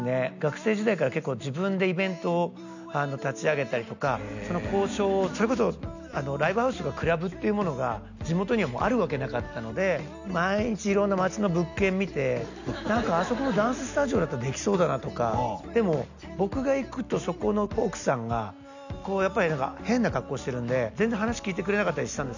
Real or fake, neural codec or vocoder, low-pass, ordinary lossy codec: real; none; 7.2 kHz; none